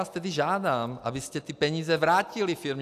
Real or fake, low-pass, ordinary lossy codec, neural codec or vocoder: real; 14.4 kHz; AAC, 96 kbps; none